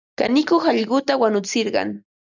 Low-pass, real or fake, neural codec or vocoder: 7.2 kHz; real; none